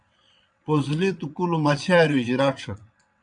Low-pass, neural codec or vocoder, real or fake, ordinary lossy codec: 9.9 kHz; vocoder, 22.05 kHz, 80 mel bands, WaveNeXt; fake; MP3, 96 kbps